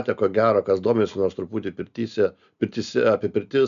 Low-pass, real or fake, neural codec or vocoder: 7.2 kHz; real; none